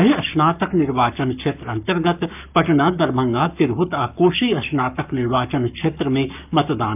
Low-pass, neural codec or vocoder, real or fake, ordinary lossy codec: 3.6 kHz; codec, 44.1 kHz, 7.8 kbps, Pupu-Codec; fake; none